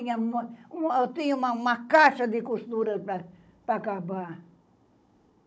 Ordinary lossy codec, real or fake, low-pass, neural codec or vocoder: none; fake; none; codec, 16 kHz, 16 kbps, FunCodec, trained on Chinese and English, 50 frames a second